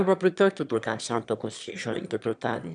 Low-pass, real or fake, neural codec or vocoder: 9.9 kHz; fake; autoencoder, 22.05 kHz, a latent of 192 numbers a frame, VITS, trained on one speaker